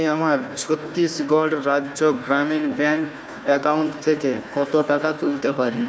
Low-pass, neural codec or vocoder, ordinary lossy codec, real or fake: none; codec, 16 kHz, 1 kbps, FunCodec, trained on Chinese and English, 50 frames a second; none; fake